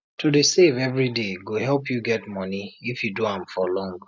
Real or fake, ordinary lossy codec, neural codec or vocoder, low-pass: real; none; none; none